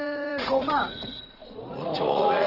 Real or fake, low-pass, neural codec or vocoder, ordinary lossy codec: fake; 5.4 kHz; vocoder, 44.1 kHz, 80 mel bands, Vocos; Opus, 16 kbps